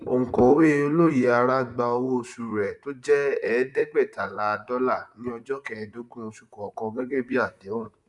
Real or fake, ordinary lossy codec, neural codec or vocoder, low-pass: fake; none; vocoder, 44.1 kHz, 128 mel bands, Pupu-Vocoder; 10.8 kHz